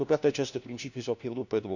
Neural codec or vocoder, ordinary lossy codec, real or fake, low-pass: codec, 16 kHz, 1 kbps, FunCodec, trained on LibriTTS, 50 frames a second; none; fake; 7.2 kHz